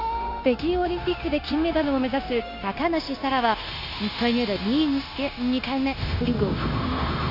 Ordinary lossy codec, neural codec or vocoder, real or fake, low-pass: none; codec, 16 kHz, 0.9 kbps, LongCat-Audio-Codec; fake; 5.4 kHz